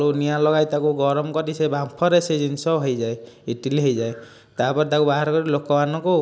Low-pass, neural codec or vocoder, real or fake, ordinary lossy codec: none; none; real; none